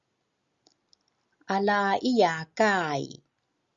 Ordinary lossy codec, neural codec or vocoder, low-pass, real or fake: Opus, 64 kbps; none; 7.2 kHz; real